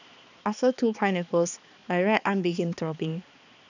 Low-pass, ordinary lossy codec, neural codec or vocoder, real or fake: 7.2 kHz; none; codec, 16 kHz, 2 kbps, X-Codec, HuBERT features, trained on balanced general audio; fake